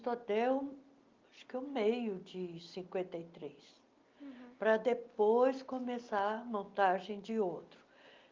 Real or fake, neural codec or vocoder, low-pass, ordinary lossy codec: real; none; 7.2 kHz; Opus, 24 kbps